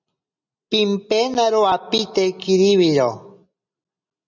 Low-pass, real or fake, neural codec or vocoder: 7.2 kHz; real; none